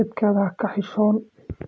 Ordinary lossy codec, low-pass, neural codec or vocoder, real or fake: none; none; none; real